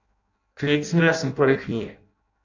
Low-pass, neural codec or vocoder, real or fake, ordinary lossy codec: 7.2 kHz; codec, 16 kHz in and 24 kHz out, 0.6 kbps, FireRedTTS-2 codec; fake; none